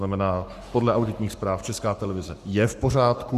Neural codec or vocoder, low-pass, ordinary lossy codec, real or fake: codec, 44.1 kHz, 7.8 kbps, Pupu-Codec; 14.4 kHz; Opus, 64 kbps; fake